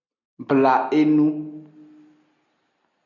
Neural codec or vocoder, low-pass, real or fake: none; 7.2 kHz; real